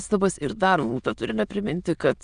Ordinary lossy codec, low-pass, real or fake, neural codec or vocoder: Opus, 64 kbps; 9.9 kHz; fake; autoencoder, 22.05 kHz, a latent of 192 numbers a frame, VITS, trained on many speakers